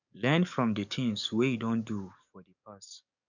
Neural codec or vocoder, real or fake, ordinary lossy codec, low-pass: codec, 44.1 kHz, 7.8 kbps, DAC; fake; none; 7.2 kHz